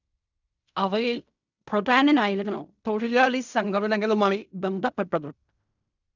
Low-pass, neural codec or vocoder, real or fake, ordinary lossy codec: 7.2 kHz; codec, 16 kHz in and 24 kHz out, 0.4 kbps, LongCat-Audio-Codec, fine tuned four codebook decoder; fake; none